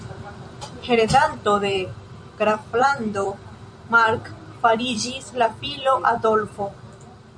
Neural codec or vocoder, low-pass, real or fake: vocoder, 44.1 kHz, 128 mel bands every 256 samples, BigVGAN v2; 9.9 kHz; fake